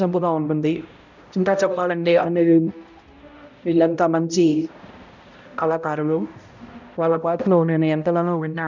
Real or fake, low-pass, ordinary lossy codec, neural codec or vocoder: fake; 7.2 kHz; none; codec, 16 kHz, 0.5 kbps, X-Codec, HuBERT features, trained on balanced general audio